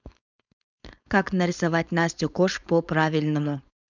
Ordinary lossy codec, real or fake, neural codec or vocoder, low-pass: none; fake; codec, 16 kHz, 4.8 kbps, FACodec; 7.2 kHz